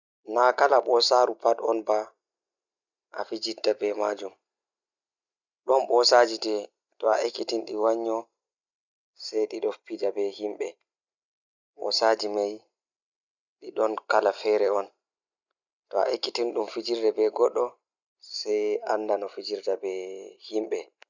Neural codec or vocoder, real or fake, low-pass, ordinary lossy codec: none; real; 7.2 kHz; none